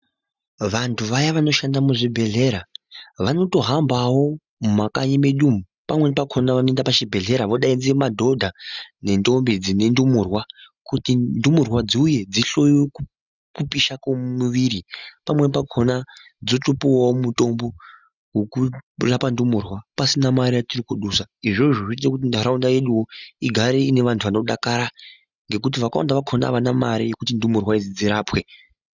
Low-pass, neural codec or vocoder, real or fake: 7.2 kHz; none; real